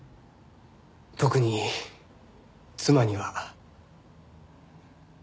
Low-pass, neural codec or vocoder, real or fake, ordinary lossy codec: none; none; real; none